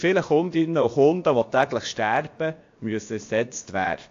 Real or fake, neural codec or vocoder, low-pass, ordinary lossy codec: fake; codec, 16 kHz, about 1 kbps, DyCAST, with the encoder's durations; 7.2 kHz; AAC, 48 kbps